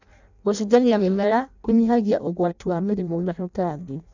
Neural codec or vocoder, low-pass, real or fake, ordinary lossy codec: codec, 16 kHz in and 24 kHz out, 0.6 kbps, FireRedTTS-2 codec; 7.2 kHz; fake; none